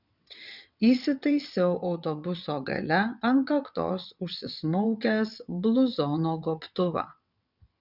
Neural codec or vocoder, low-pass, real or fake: vocoder, 22.05 kHz, 80 mel bands, WaveNeXt; 5.4 kHz; fake